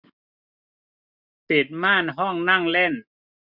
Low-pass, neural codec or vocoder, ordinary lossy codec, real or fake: 5.4 kHz; none; none; real